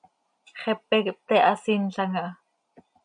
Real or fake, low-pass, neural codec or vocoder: real; 9.9 kHz; none